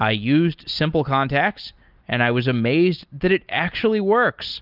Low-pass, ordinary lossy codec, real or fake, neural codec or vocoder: 5.4 kHz; Opus, 32 kbps; real; none